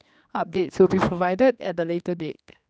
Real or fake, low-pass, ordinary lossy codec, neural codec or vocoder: fake; none; none; codec, 16 kHz, 2 kbps, X-Codec, HuBERT features, trained on general audio